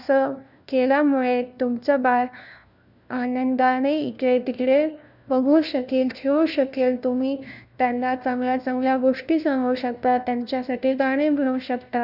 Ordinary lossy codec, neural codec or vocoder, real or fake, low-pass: none; codec, 16 kHz, 1 kbps, FunCodec, trained on LibriTTS, 50 frames a second; fake; 5.4 kHz